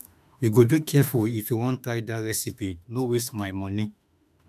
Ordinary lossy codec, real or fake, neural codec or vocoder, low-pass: none; fake; autoencoder, 48 kHz, 32 numbers a frame, DAC-VAE, trained on Japanese speech; 14.4 kHz